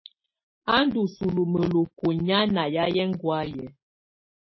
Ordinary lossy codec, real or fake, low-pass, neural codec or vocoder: MP3, 24 kbps; real; 7.2 kHz; none